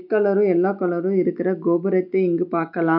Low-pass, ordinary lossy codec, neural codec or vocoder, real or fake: 5.4 kHz; MP3, 48 kbps; none; real